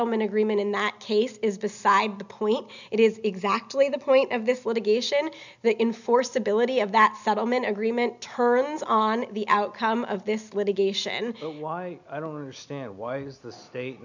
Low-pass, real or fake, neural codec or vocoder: 7.2 kHz; real; none